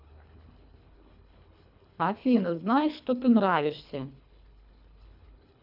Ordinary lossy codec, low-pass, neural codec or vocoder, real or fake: AAC, 48 kbps; 5.4 kHz; codec, 24 kHz, 3 kbps, HILCodec; fake